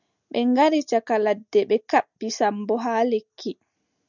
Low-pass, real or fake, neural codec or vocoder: 7.2 kHz; real; none